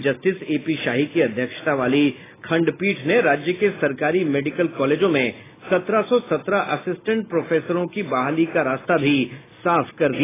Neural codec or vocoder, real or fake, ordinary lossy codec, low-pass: none; real; AAC, 16 kbps; 3.6 kHz